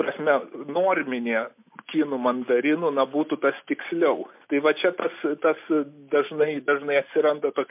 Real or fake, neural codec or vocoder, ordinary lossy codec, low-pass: real; none; MP3, 32 kbps; 3.6 kHz